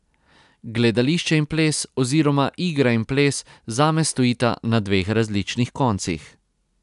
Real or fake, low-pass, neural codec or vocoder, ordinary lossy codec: real; 10.8 kHz; none; none